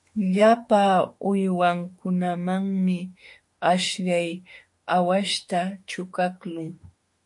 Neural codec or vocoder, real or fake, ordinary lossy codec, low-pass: autoencoder, 48 kHz, 32 numbers a frame, DAC-VAE, trained on Japanese speech; fake; MP3, 48 kbps; 10.8 kHz